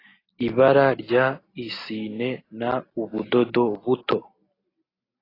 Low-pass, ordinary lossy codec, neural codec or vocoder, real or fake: 5.4 kHz; AAC, 24 kbps; none; real